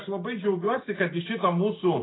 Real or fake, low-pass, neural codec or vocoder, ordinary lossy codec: real; 7.2 kHz; none; AAC, 16 kbps